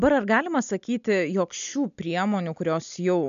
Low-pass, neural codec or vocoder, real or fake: 7.2 kHz; none; real